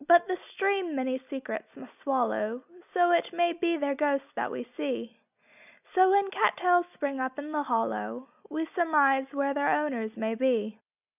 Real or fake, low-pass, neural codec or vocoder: real; 3.6 kHz; none